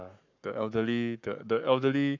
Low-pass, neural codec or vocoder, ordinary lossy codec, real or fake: 7.2 kHz; codec, 44.1 kHz, 7.8 kbps, Pupu-Codec; none; fake